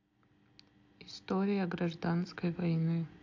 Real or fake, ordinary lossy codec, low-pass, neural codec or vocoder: real; AAC, 48 kbps; 7.2 kHz; none